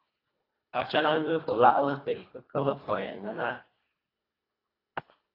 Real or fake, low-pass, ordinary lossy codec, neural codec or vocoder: fake; 5.4 kHz; AAC, 24 kbps; codec, 24 kHz, 1.5 kbps, HILCodec